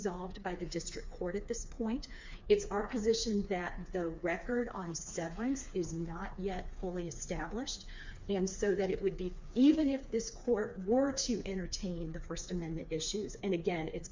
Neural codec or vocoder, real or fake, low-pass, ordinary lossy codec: codec, 16 kHz, 4 kbps, FreqCodec, smaller model; fake; 7.2 kHz; MP3, 48 kbps